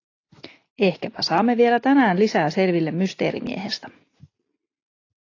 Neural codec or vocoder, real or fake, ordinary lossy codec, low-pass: none; real; AAC, 32 kbps; 7.2 kHz